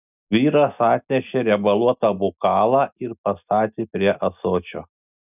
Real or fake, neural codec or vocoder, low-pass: real; none; 3.6 kHz